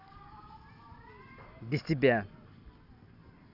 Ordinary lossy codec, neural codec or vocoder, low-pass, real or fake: none; none; 5.4 kHz; real